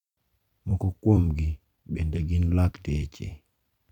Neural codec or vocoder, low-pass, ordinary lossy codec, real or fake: vocoder, 44.1 kHz, 128 mel bands every 256 samples, BigVGAN v2; 19.8 kHz; none; fake